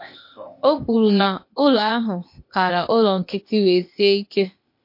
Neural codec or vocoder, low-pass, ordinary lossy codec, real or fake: codec, 16 kHz, 0.8 kbps, ZipCodec; 5.4 kHz; MP3, 32 kbps; fake